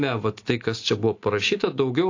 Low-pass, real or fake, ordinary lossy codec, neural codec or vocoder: 7.2 kHz; real; AAC, 48 kbps; none